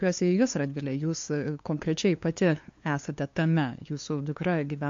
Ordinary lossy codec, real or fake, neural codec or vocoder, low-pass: MP3, 48 kbps; fake; codec, 16 kHz, 2 kbps, FunCodec, trained on LibriTTS, 25 frames a second; 7.2 kHz